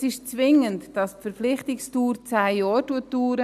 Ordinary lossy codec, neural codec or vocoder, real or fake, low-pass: none; none; real; 14.4 kHz